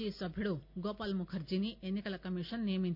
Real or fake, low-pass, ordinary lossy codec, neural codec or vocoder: real; 5.4 kHz; none; none